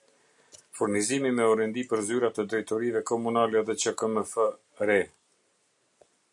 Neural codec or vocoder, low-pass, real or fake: none; 10.8 kHz; real